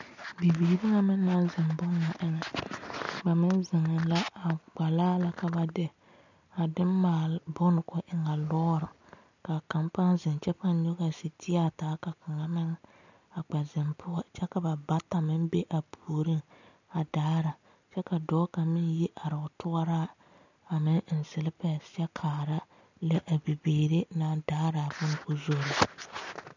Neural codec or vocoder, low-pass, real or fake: none; 7.2 kHz; real